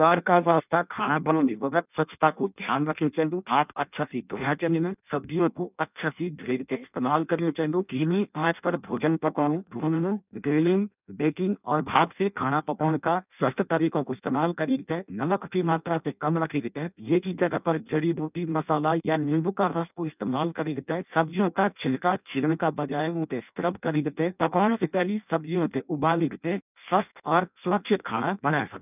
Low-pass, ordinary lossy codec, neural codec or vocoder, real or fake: 3.6 kHz; none; codec, 16 kHz in and 24 kHz out, 0.6 kbps, FireRedTTS-2 codec; fake